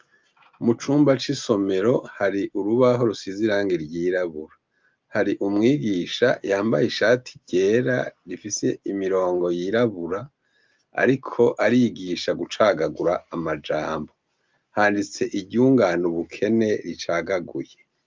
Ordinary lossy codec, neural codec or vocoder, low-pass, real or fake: Opus, 24 kbps; none; 7.2 kHz; real